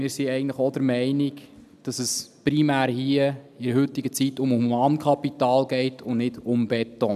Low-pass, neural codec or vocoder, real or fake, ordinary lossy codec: 14.4 kHz; none; real; none